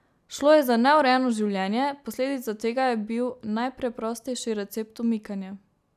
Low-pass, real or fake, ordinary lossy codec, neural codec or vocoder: 14.4 kHz; real; none; none